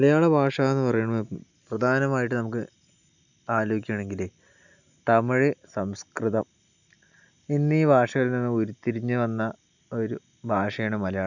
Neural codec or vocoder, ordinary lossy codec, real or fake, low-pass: none; none; real; 7.2 kHz